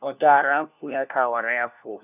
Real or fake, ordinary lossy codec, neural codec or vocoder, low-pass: fake; none; codec, 16 kHz, 1 kbps, FunCodec, trained on LibriTTS, 50 frames a second; 3.6 kHz